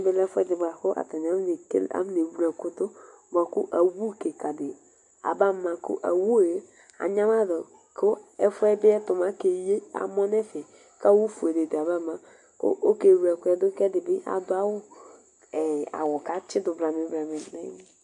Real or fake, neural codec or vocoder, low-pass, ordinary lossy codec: real; none; 10.8 kHz; MP3, 64 kbps